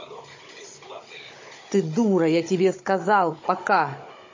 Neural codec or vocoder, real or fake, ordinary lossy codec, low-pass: codec, 16 kHz, 16 kbps, FunCodec, trained on Chinese and English, 50 frames a second; fake; MP3, 32 kbps; 7.2 kHz